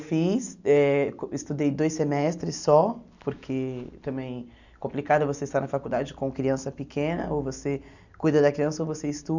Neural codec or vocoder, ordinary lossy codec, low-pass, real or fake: none; none; 7.2 kHz; real